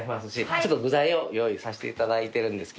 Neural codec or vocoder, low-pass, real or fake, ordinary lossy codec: none; none; real; none